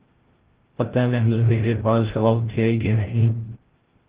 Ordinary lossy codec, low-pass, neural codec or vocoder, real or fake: Opus, 16 kbps; 3.6 kHz; codec, 16 kHz, 0.5 kbps, FreqCodec, larger model; fake